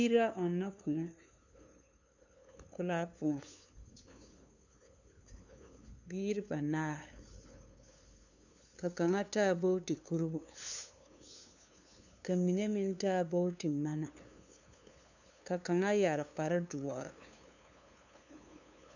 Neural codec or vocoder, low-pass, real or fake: codec, 16 kHz, 2 kbps, FunCodec, trained on LibriTTS, 25 frames a second; 7.2 kHz; fake